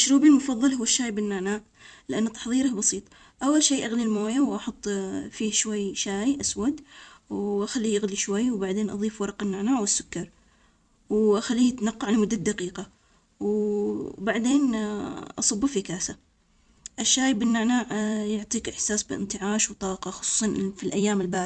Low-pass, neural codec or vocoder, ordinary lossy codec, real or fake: 9.9 kHz; vocoder, 44.1 kHz, 128 mel bands every 256 samples, BigVGAN v2; none; fake